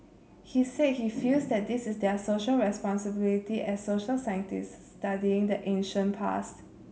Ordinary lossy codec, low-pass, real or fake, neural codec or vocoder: none; none; real; none